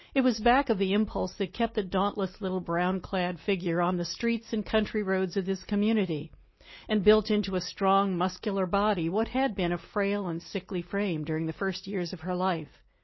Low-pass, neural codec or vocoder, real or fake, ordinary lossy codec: 7.2 kHz; none; real; MP3, 24 kbps